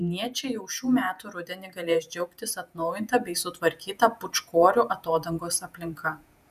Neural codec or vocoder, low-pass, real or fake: vocoder, 48 kHz, 128 mel bands, Vocos; 14.4 kHz; fake